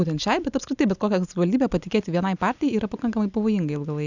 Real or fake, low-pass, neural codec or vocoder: real; 7.2 kHz; none